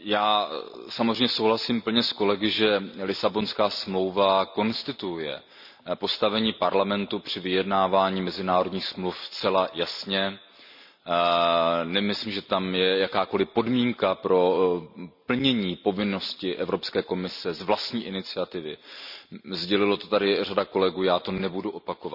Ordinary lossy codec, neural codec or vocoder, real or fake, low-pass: none; none; real; 5.4 kHz